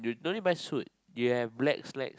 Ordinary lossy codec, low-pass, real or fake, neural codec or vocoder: none; none; real; none